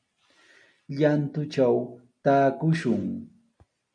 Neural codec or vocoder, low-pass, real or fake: none; 9.9 kHz; real